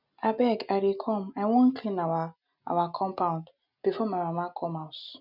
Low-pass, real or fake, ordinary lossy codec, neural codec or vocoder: 5.4 kHz; real; none; none